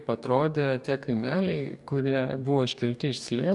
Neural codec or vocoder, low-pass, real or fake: codec, 44.1 kHz, 2.6 kbps, DAC; 10.8 kHz; fake